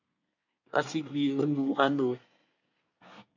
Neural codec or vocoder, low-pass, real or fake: codec, 24 kHz, 1 kbps, SNAC; 7.2 kHz; fake